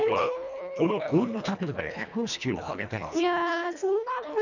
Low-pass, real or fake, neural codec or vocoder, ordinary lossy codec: 7.2 kHz; fake; codec, 24 kHz, 1.5 kbps, HILCodec; none